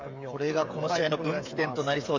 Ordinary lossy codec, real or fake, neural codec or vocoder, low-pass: AAC, 48 kbps; fake; codec, 16 kHz, 16 kbps, FreqCodec, smaller model; 7.2 kHz